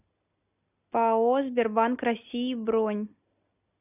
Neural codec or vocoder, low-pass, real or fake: none; 3.6 kHz; real